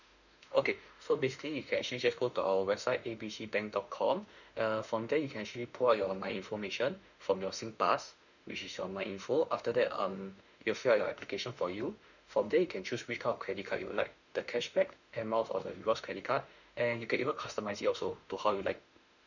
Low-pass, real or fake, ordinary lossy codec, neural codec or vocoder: 7.2 kHz; fake; none; autoencoder, 48 kHz, 32 numbers a frame, DAC-VAE, trained on Japanese speech